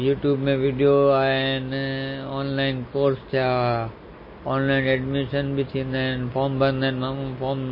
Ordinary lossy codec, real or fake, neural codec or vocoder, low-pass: MP3, 24 kbps; real; none; 5.4 kHz